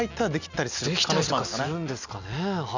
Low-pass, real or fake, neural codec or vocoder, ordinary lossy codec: 7.2 kHz; real; none; none